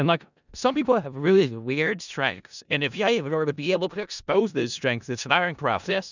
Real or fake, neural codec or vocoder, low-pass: fake; codec, 16 kHz in and 24 kHz out, 0.4 kbps, LongCat-Audio-Codec, four codebook decoder; 7.2 kHz